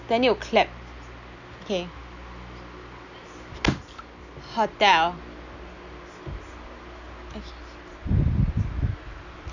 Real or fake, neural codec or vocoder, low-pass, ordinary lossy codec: real; none; 7.2 kHz; none